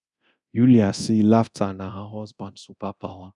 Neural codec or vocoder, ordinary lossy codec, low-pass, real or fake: codec, 24 kHz, 0.9 kbps, DualCodec; none; 10.8 kHz; fake